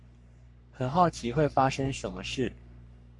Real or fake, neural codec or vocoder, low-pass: fake; codec, 44.1 kHz, 3.4 kbps, Pupu-Codec; 10.8 kHz